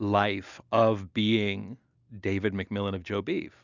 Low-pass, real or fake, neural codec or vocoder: 7.2 kHz; real; none